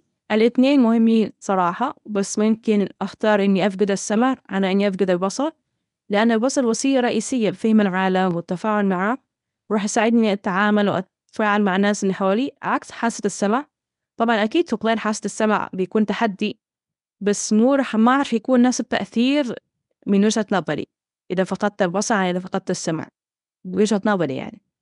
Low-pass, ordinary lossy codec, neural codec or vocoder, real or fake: 10.8 kHz; none; codec, 24 kHz, 0.9 kbps, WavTokenizer, medium speech release version 1; fake